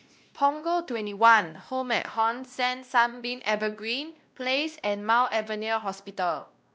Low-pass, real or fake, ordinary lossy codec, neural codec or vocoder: none; fake; none; codec, 16 kHz, 1 kbps, X-Codec, WavLM features, trained on Multilingual LibriSpeech